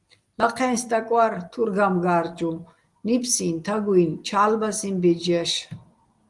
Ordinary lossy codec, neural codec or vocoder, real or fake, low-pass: Opus, 24 kbps; none; real; 10.8 kHz